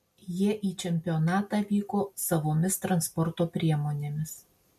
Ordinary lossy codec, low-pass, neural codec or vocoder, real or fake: MP3, 64 kbps; 14.4 kHz; none; real